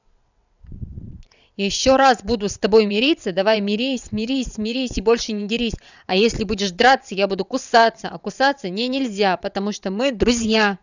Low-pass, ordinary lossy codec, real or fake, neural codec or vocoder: 7.2 kHz; none; fake; vocoder, 22.05 kHz, 80 mel bands, WaveNeXt